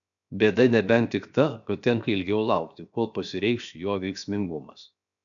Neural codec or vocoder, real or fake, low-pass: codec, 16 kHz, 0.7 kbps, FocalCodec; fake; 7.2 kHz